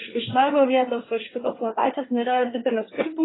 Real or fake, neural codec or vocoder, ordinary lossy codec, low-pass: fake; codec, 24 kHz, 1 kbps, SNAC; AAC, 16 kbps; 7.2 kHz